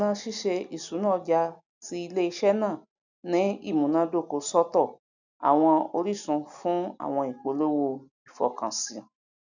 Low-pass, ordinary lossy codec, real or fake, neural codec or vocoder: 7.2 kHz; none; real; none